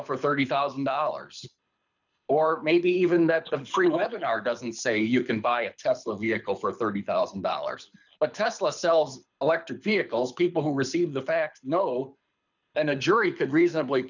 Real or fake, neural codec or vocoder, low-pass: fake; codec, 24 kHz, 6 kbps, HILCodec; 7.2 kHz